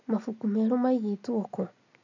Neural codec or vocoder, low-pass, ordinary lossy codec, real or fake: none; 7.2 kHz; none; real